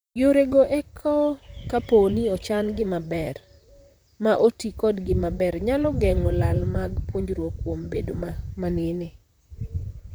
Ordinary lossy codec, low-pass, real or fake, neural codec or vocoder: none; none; fake; vocoder, 44.1 kHz, 128 mel bands, Pupu-Vocoder